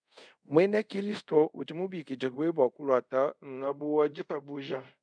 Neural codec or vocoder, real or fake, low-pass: codec, 24 kHz, 0.5 kbps, DualCodec; fake; 9.9 kHz